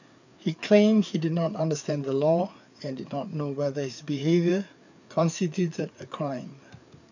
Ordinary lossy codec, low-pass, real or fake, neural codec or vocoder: none; 7.2 kHz; fake; codec, 16 kHz, 4 kbps, FreqCodec, larger model